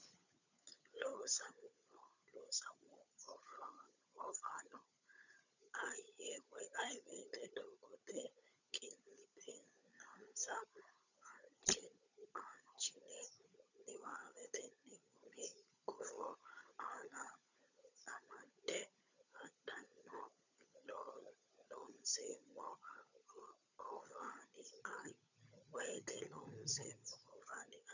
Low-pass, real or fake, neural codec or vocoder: 7.2 kHz; fake; codec, 16 kHz, 16 kbps, FunCodec, trained on LibriTTS, 50 frames a second